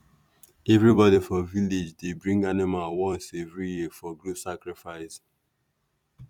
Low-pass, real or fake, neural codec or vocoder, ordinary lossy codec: 19.8 kHz; fake; vocoder, 44.1 kHz, 128 mel bands every 256 samples, BigVGAN v2; none